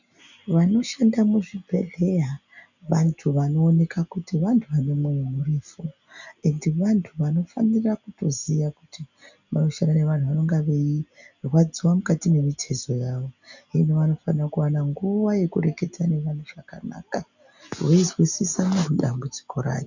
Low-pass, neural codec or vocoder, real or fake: 7.2 kHz; none; real